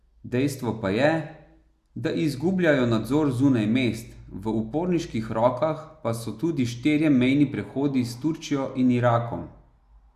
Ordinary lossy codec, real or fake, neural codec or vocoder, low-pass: Opus, 64 kbps; real; none; 14.4 kHz